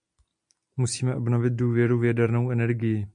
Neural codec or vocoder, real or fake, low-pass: none; real; 9.9 kHz